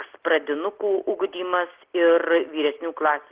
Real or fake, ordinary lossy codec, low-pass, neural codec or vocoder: real; Opus, 16 kbps; 3.6 kHz; none